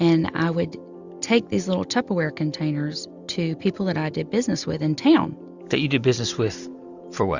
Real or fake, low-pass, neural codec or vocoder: real; 7.2 kHz; none